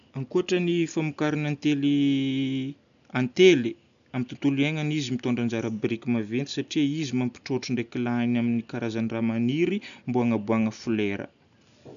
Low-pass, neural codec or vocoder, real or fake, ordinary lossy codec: 7.2 kHz; none; real; none